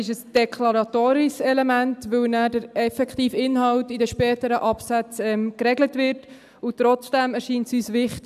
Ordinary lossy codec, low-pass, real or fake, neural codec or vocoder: none; 14.4 kHz; real; none